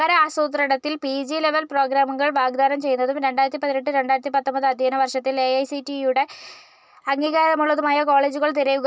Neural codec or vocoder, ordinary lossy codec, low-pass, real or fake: none; none; none; real